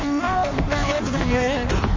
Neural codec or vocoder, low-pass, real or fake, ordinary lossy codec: codec, 16 kHz in and 24 kHz out, 0.6 kbps, FireRedTTS-2 codec; 7.2 kHz; fake; MP3, 32 kbps